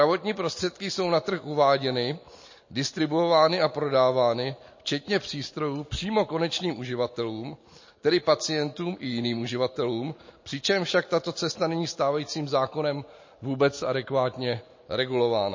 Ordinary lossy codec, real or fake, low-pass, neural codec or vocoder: MP3, 32 kbps; real; 7.2 kHz; none